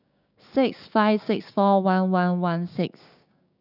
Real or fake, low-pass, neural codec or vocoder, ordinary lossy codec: fake; 5.4 kHz; codec, 16 kHz, 6 kbps, DAC; none